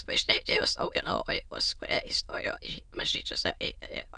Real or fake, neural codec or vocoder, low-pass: fake; autoencoder, 22.05 kHz, a latent of 192 numbers a frame, VITS, trained on many speakers; 9.9 kHz